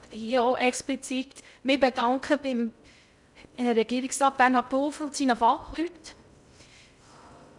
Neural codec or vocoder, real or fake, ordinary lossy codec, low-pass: codec, 16 kHz in and 24 kHz out, 0.6 kbps, FocalCodec, streaming, 2048 codes; fake; none; 10.8 kHz